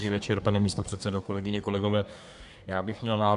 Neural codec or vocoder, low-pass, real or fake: codec, 24 kHz, 1 kbps, SNAC; 10.8 kHz; fake